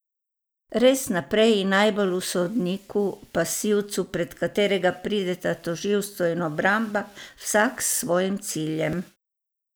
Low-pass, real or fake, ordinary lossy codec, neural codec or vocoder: none; real; none; none